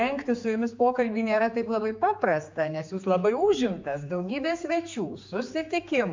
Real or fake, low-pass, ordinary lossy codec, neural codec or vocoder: fake; 7.2 kHz; MP3, 64 kbps; codec, 16 kHz, 4 kbps, X-Codec, HuBERT features, trained on general audio